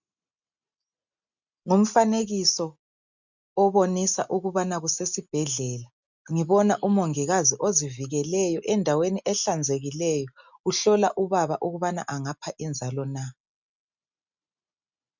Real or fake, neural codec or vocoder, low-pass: real; none; 7.2 kHz